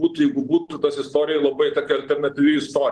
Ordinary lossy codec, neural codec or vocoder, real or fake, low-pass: Opus, 16 kbps; none; real; 10.8 kHz